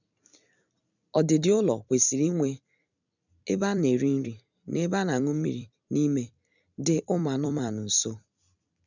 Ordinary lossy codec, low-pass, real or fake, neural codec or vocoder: none; 7.2 kHz; fake; vocoder, 44.1 kHz, 128 mel bands every 256 samples, BigVGAN v2